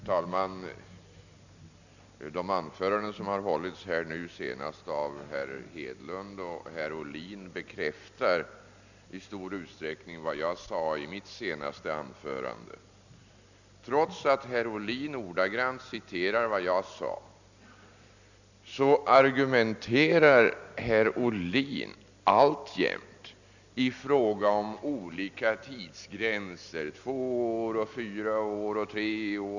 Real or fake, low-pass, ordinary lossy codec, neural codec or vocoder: real; 7.2 kHz; none; none